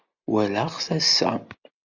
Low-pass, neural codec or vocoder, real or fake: 7.2 kHz; none; real